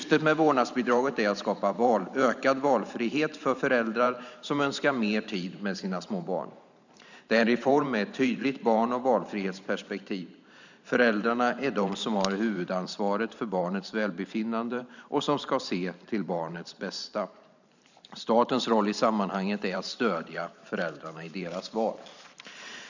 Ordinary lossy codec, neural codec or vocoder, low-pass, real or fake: none; vocoder, 44.1 kHz, 128 mel bands every 512 samples, BigVGAN v2; 7.2 kHz; fake